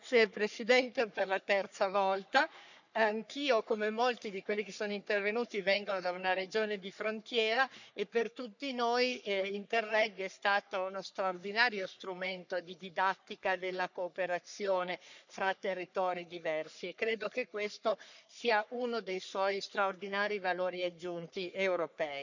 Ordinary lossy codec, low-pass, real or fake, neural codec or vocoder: none; 7.2 kHz; fake; codec, 44.1 kHz, 3.4 kbps, Pupu-Codec